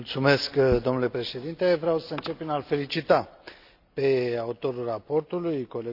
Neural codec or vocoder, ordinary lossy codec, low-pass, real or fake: none; none; 5.4 kHz; real